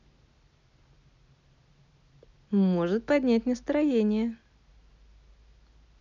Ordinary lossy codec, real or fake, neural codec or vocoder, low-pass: none; real; none; 7.2 kHz